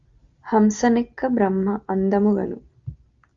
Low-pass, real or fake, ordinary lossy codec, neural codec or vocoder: 7.2 kHz; real; Opus, 32 kbps; none